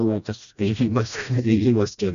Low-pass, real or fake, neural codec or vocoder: 7.2 kHz; fake; codec, 16 kHz, 1 kbps, FreqCodec, smaller model